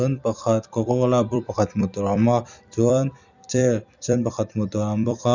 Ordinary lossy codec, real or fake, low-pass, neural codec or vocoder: none; fake; 7.2 kHz; vocoder, 44.1 kHz, 128 mel bands every 256 samples, BigVGAN v2